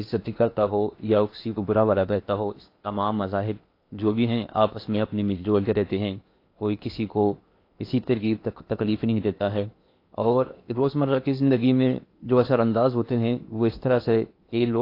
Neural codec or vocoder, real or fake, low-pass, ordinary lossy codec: codec, 16 kHz in and 24 kHz out, 0.8 kbps, FocalCodec, streaming, 65536 codes; fake; 5.4 kHz; AAC, 32 kbps